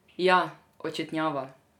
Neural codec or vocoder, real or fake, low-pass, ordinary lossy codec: none; real; 19.8 kHz; none